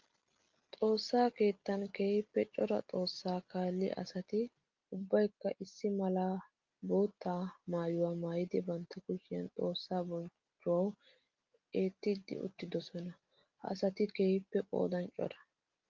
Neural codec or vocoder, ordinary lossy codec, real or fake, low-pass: none; Opus, 32 kbps; real; 7.2 kHz